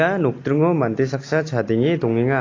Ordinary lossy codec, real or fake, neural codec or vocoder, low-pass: AAC, 32 kbps; real; none; 7.2 kHz